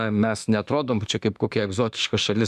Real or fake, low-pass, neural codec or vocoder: fake; 14.4 kHz; autoencoder, 48 kHz, 32 numbers a frame, DAC-VAE, trained on Japanese speech